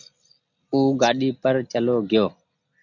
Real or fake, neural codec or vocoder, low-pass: real; none; 7.2 kHz